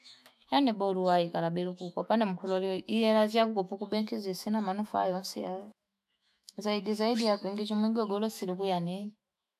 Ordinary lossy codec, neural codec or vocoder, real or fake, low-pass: none; autoencoder, 48 kHz, 128 numbers a frame, DAC-VAE, trained on Japanese speech; fake; 14.4 kHz